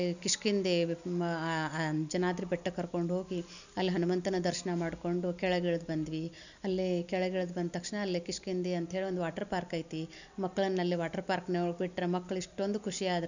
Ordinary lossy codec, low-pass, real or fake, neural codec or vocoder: none; 7.2 kHz; real; none